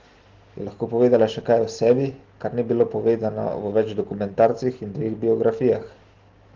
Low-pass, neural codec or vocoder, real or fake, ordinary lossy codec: 7.2 kHz; none; real; Opus, 16 kbps